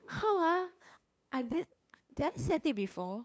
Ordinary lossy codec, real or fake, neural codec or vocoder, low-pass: none; fake; codec, 16 kHz, 2 kbps, FunCodec, trained on LibriTTS, 25 frames a second; none